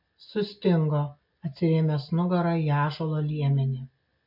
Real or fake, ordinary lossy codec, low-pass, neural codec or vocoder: real; MP3, 48 kbps; 5.4 kHz; none